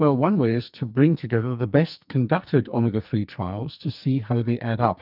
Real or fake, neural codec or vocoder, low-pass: fake; codec, 44.1 kHz, 2.6 kbps, SNAC; 5.4 kHz